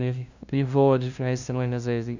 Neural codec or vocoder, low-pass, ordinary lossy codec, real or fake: codec, 16 kHz, 0.5 kbps, FunCodec, trained on LibriTTS, 25 frames a second; 7.2 kHz; none; fake